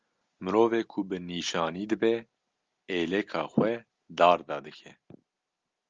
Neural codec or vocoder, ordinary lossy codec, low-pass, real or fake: none; Opus, 32 kbps; 7.2 kHz; real